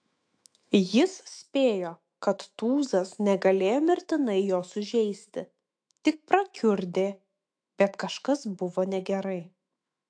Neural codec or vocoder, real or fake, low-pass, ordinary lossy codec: autoencoder, 48 kHz, 128 numbers a frame, DAC-VAE, trained on Japanese speech; fake; 9.9 kHz; AAC, 64 kbps